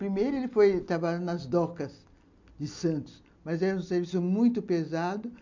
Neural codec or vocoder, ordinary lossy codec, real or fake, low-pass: none; MP3, 64 kbps; real; 7.2 kHz